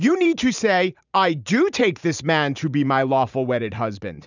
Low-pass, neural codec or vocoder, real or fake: 7.2 kHz; vocoder, 44.1 kHz, 128 mel bands every 512 samples, BigVGAN v2; fake